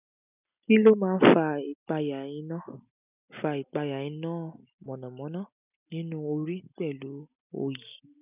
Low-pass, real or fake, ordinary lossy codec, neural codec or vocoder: 3.6 kHz; real; none; none